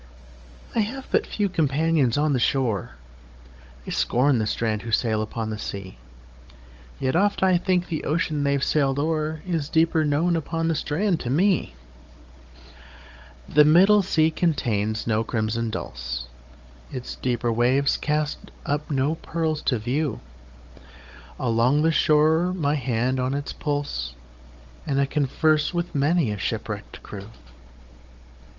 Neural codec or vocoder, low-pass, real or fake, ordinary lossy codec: codec, 16 kHz, 16 kbps, FunCodec, trained on Chinese and English, 50 frames a second; 7.2 kHz; fake; Opus, 24 kbps